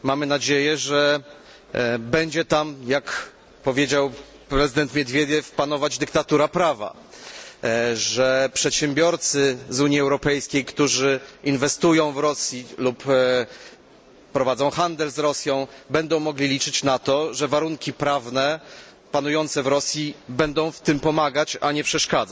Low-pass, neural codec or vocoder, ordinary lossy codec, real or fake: none; none; none; real